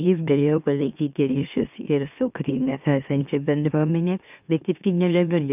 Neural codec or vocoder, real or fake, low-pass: autoencoder, 44.1 kHz, a latent of 192 numbers a frame, MeloTTS; fake; 3.6 kHz